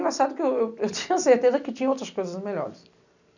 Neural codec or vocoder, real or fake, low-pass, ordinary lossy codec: none; real; 7.2 kHz; none